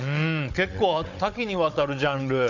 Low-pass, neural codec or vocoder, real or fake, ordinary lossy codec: 7.2 kHz; codec, 16 kHz, 16 kbps, FunCodec, trained on Chinese and English, 50 frames a second; fake; none